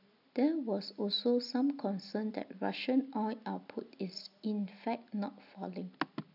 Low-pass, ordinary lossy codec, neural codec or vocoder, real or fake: 5.4 kHz; none; none; real